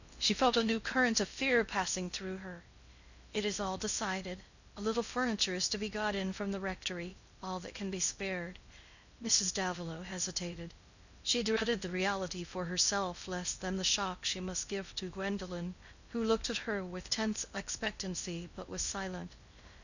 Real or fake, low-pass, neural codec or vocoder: fake; 7.2 kHz; codec, 16 kHz in and 24 kHz out, 0.6 kbps, FocalCodec, streaming, 4096 codes